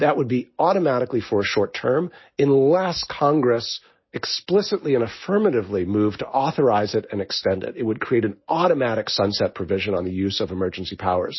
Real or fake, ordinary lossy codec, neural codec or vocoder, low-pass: real; MP3, 24 kbps; none; 7.2 kHz